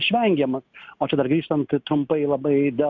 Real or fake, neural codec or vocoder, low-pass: real; none; 7.2 kHz